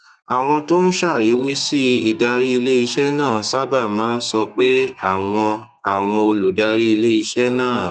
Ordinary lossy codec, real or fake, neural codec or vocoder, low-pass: none; fake; codec, 32 kHz, 1.9 kbps, SNAC; 9.9 kHz